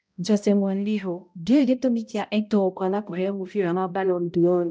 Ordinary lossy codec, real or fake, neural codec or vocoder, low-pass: none; fake; codec, 16 kHz, 0.5 kbps, X-Codec, HuBERT features, trained on balanced general audio; none